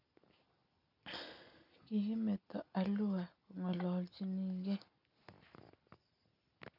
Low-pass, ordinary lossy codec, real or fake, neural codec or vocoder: 5.4 kHz; none; real; none